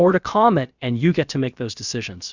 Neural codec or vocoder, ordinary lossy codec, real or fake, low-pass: codec, 16 kHz, about 1 kbps, DyCAST, with the encoder's durations; Opus, 64 kbps; fake; 7.2 kHz